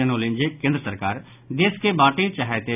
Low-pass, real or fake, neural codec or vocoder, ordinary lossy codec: 3.6 kHz; real; none; none